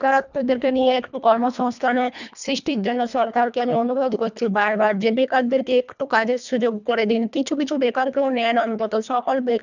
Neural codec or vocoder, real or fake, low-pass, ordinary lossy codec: codec, 24 kHz, 1.5 kbps, HILCodec; fake; 7.2 kHz; none